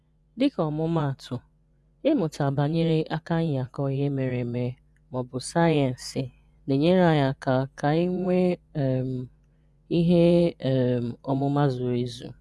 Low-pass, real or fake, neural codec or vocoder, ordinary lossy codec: none; fake; vocoder, 24 kHz, 100 mel bands, Vocos; none